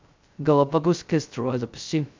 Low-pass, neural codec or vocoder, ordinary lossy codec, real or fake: 7.2 kHz; codec, 16 kHz, 0.2 kbps, FocalCodec; MP3, 64 kbps; fake